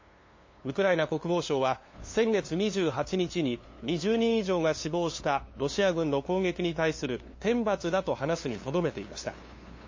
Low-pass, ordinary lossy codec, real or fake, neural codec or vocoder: 7.2 kHz; MP3, 32 kbps; fake; codec, 16 kHz, 2 kbps, FunCodec, trained on LibriTTS, 25 frames a second